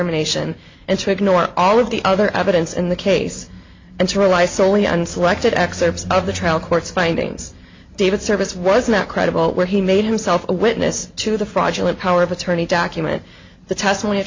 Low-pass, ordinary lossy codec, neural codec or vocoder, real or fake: 7.2 kHz; MP3, 64 kbps; none; real